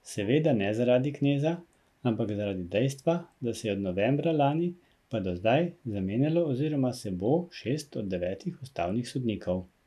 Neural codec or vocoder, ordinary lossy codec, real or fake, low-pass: none; none; real; 14.4 kHz